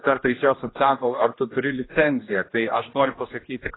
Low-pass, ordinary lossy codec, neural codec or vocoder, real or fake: 7.2 kHz; AAC, 16 kbps; codec, 16 kHz, 1 kbps, X-Codec, HuBERT features, trained on general audio; fake